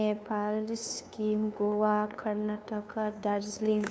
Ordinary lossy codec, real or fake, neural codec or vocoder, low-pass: none; fake; codec, 16 kHz, 2 kbps, FunCodec, trained on LibriTTS, 25 frames a second; none